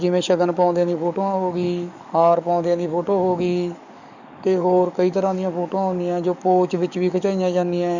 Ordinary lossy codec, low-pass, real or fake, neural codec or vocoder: none; 7.2 kHz; fake; codec, 44.1 kHz, 7.8 kbps, DAC